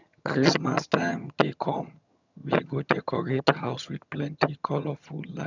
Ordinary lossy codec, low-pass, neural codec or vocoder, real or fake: none; 7.2 kHz; vocoder, 22.05 kHz, 80 mel bands, HiFi-GAN; fake